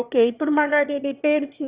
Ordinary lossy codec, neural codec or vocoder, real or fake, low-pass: Opus, 64 kbps; autoencoder, 22.05 kHz, a latent of 192 numbers a frame, VITS, trained on one speaker; fake; 3.6 kHz